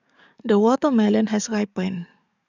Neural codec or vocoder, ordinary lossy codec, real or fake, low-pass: codec, 44.1 kHz, 7.8 kbps, DAC; none; fake; 7.2 kHz